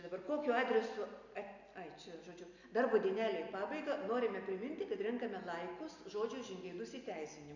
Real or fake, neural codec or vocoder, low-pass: real; none; 7.2 kHz